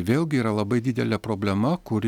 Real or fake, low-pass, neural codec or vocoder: real; 19.8 kHz; none